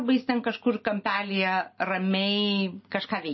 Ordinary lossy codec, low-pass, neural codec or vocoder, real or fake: MP3, 24 kbps; 7.2 kHz; none; real